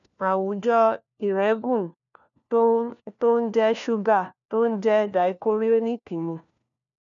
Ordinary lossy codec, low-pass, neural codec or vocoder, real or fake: none; 7.2 kHz; codec, 16 kHz, 1 kbps, FunCodec, trained on LibriTTS, 50 frames a second; fake